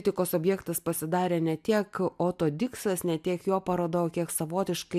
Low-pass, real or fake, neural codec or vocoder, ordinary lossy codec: 14.4 kHz; real; none; AAC, 96 kbps